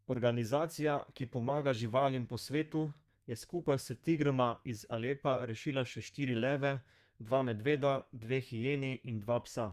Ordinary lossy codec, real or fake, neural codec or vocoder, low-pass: Opus, 64 kbps; fake; codec, 32 kHz, 1.9 kbps, SNAC; 14.4 kHz